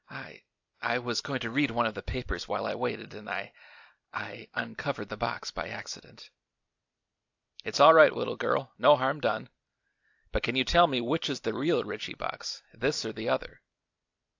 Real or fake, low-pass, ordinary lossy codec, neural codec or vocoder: real; 7.2 kHz; AAC, 48 kbps; none